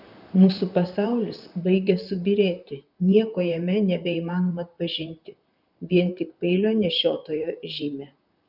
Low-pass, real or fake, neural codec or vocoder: 5.4 kHz; fake; vocoder, 44.1 kHz, 128 mel bands, Pupu-Vocoder